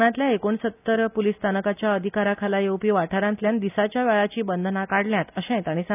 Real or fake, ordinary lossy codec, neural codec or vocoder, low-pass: real; none; none; 3.6 kHz